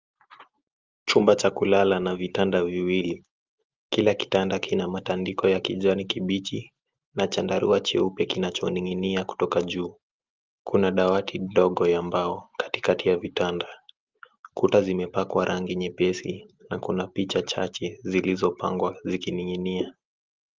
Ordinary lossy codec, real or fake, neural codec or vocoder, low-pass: Opus, 32 kbps; real; none; 7.2 kHz